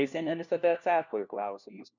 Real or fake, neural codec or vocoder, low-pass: fake; codec, 16 kHz, 1 kbps, FunCodec, trained on LibriTTS, 50 frames a second; 7.2 kHz